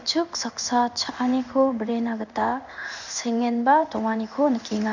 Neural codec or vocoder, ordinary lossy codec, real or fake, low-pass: codec, 16 kHz in and 24 kHz out, 1 kbps, XY-Tokenizer; none; fake; 7.2 kHz